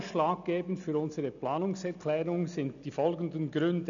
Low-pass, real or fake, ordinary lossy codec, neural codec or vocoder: 7.2 kHz; real; none; none